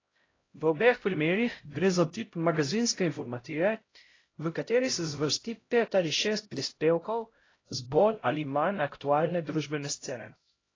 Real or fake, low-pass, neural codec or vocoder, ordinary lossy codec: fake; 7.2 kHz; codec, 16 kHz, 0.5 kbps, X-Codec, HuBERT features, trained on LibriSpeech; AAC, 32 kbps